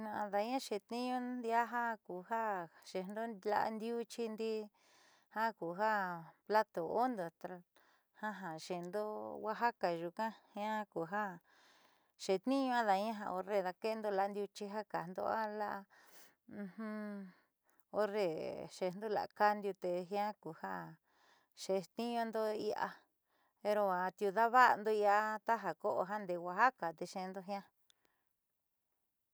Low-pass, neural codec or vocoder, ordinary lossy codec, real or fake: none; none; none; real